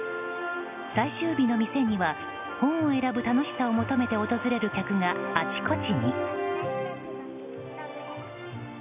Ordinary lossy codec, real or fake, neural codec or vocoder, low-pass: none; real; none; 3.6 kHz